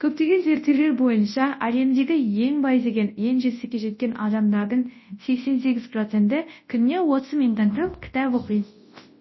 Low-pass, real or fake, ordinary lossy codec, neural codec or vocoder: 7.2 kHz; fake; MP3, 24 kbps; codec, 24 kHz, 0.9 kbps, WavTokenizer, large speech release